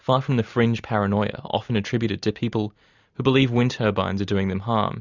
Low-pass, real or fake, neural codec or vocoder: 7.2 kHz; real; none